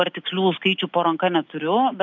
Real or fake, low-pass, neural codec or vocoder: real; 7.2 kHz; none